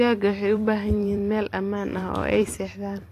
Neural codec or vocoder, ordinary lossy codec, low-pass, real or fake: vocoder, 44.1 kHz, 128 mel bands every 256 samples, BigVGAN v2; AAC, 48 kbps; 14.4 kHz; fake